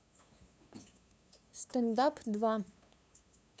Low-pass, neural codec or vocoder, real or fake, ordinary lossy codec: none; codec, 16 kHz, 2 kbps, FunCodec, trained on LibriTTS, 25 frames a second; fake; none